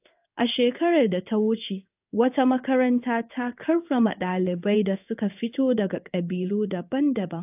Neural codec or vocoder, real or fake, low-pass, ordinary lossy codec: codec, 16 kHz in and 24 kHz out, 1 kbps, XY-Tokenizer; fake; 3.6 kHz; AAC, 32 kbps